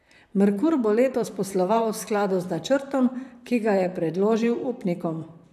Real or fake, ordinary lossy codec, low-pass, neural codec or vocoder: fake; none; 14.4 kHz; vocoder, 44.1 kHz, 128 mel bands, Pupu-Vocoder